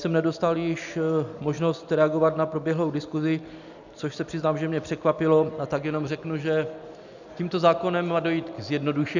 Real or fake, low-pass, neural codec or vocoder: real; 7.2 kHz; none